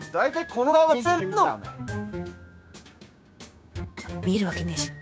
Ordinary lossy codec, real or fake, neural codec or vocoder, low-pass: none; fake; codec, 16 kHz, 6 kbps, DAC; none